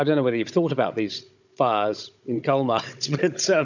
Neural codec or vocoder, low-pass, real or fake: codec, 16 kHz, 16 kbps, FunCodec, trained on Chinese and English, 50 frames a second; 7.2 kHz; fake